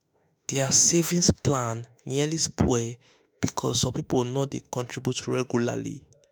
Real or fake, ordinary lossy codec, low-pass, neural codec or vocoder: fake; none; none; autoencoder, 48 kHz, 32 numbers a frame, DAC-VAE, trained on Japanese speech